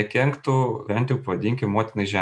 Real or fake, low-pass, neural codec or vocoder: real; 9.9 kHz; none